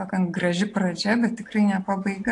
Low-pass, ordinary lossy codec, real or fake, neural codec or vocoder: 10.8 kHz; AAC, 48 kbps; real; none